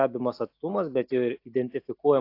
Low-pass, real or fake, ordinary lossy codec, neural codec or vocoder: 5.4 kHz; real; AAC, 32 kbps; none